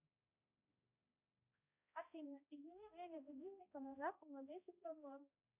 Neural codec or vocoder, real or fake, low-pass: codec, 16 kHz, 0.5 kbps, X-Codec, HuBERT features, trained on balanced general audio; fake; 3.6 kHz